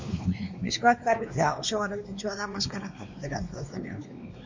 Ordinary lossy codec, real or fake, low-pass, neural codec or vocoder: MP3, 48 kbps; fake; 7.2 kHz; codec, 16 kHz, 2 kbps, X-Codec, WavLM features, trained on Multilingual LibriSpeech